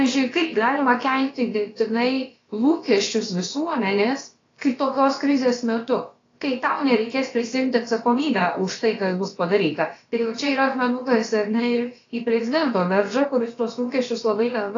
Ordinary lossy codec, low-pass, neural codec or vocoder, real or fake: AAC, 32 kbps; 7.2 kHz; codec, 16 kHz, about 1 kbps, DyCAST, with the encoder's durations; fake